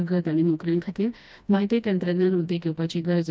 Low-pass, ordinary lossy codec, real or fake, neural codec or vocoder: none; none; fake; codec, 16 kHz, 1 kbps, FreqCodec, smaller model